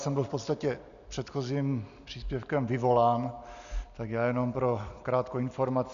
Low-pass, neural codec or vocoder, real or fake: 7.2 kHz; none; real